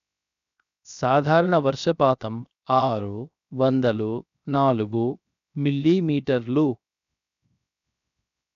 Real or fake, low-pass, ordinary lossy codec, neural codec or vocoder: fake; 7.2 kHz; none; codec, 16 kHz, 0.7 kbps, FocalCodec